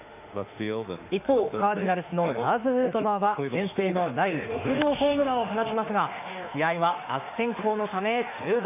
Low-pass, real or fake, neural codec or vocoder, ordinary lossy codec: 3.6 kHz; fake; autoencoder, 48 kHz, 32 numbers a frame, DAC-VAE, trained on Japanese speech; none